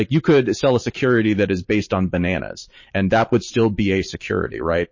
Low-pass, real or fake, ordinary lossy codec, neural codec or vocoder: 7.2 kHz; fake; MP3, 32 kbps; vocoder, 44.1 kHz, 128 mel bands every 512 samples, BigVGAN v2